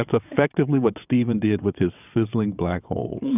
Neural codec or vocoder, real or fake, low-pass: vocoder, 22.05 kHz, 80 mel bands, WaveNeXt; fake; 3.6 kHz